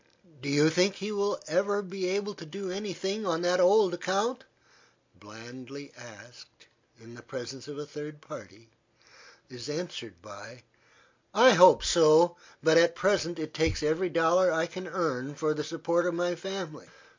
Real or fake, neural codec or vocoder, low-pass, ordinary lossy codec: real; none; 7.2 kHz; MP3, 48 kbps